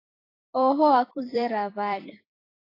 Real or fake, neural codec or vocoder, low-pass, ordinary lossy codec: fake; vocoder, 44.1 kHz, 128 mel bands every 256 samples, BigVGAN v2; 5.4 kHz; AAC, 24 kbps